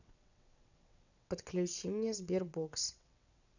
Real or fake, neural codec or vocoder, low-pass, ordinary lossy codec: fake; vocoder, 22.05 kHz, 80 mel bands, WaveNeXt; 7.2 kHz; none